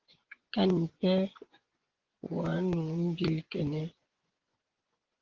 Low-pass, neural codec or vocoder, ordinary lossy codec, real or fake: 7.2 kHz; vocoder, 24 kHz, 100 mel bands, Vocos; Opus, 16 kbps; fake